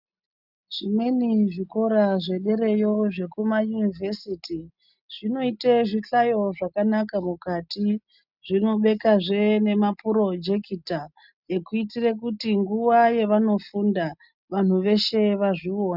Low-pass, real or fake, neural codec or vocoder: 5.4 kHz; real; none